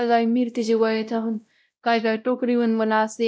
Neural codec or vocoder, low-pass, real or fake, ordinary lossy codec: codec, 16 kHz, 0.5 kbps, X-Codec, WavLM features, trained on Multilingual LibriSpeech; none; fake; none